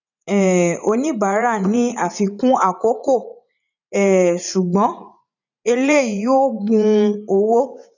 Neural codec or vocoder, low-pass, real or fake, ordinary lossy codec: vocoder, 44.1 kHz, 80 mel bands, Vocos; 7.2 kHz; fake; none